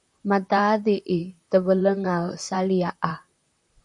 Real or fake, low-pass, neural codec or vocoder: fake; 10.8 kHz; vocoder, 44.1 kHz, 128 mel bands, Pupu-Vocoder